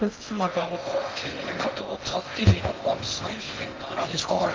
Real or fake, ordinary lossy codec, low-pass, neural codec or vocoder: fake; Opus, 24 kbps; 7.2 kHz; codec, 16 kHz in and 24 kHz out, 0.6 kbps, FocalCodec, streaming, 2048 codes